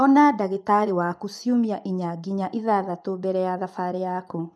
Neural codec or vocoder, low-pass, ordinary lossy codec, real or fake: vocoder, 24 kHz, 100 mel bands, Vocos; none; none; fake